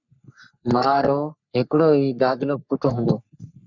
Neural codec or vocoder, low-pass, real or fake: codec, 44.1 kHz, 3.4 kbps, Pupu-Codec; 7.2 kHz; fake